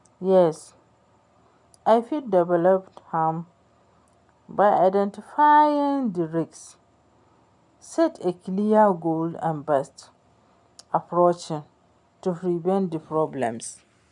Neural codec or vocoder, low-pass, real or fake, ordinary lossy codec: none; 10.8 kHz; real; none